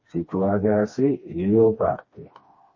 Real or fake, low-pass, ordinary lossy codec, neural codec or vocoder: fake; 7.2 kHz; MP3, 32 kbps; codec, 16 kHz, 2 kbps, FreqCodec, smaller model